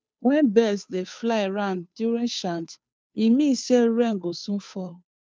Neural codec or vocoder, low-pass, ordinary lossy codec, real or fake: codec, 16 kHz, 2 kbps, FunCodec, trained on Chinese and English, 25 frames a second; none; none; fake